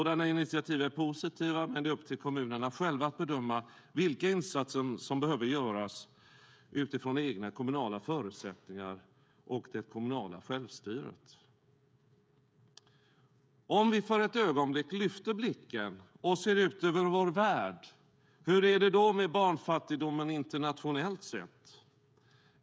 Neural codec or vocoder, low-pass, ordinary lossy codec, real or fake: codec, 16 kHz, 16 kbps, FreqCodec, smaller model; none; none; fake